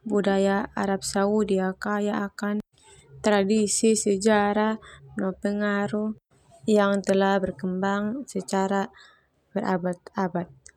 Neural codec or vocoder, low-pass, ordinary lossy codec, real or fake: none; 19.8 kHz; none; real